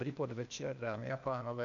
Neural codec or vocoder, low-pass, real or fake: codec, 16 kHz, 0.8 kbps, ZipCodec; 7.2 kHz; fake